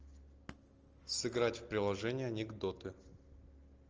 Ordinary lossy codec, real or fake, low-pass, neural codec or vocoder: Opus, 24 kbps; real; 7.2 kHz; none